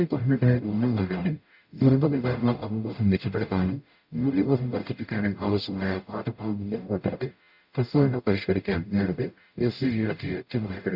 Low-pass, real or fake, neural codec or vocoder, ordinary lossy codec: 5.4 kHz; fake; codec, 44.1 kHz, 0.9 kbps, DAC; none